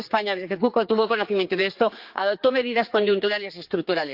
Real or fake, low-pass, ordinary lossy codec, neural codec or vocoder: fake; 5.4 kHz; Opus, 24 kbps; codec, 16 kHz, 4 kbps, X-Codec, HuBERT features, trained on general audio